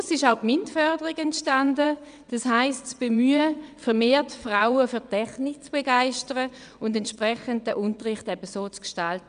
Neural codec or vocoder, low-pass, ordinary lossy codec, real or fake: vocoder, 22.05 kHz, 80 mel bands, WaveNeXt; 9.9 kHz; none; fake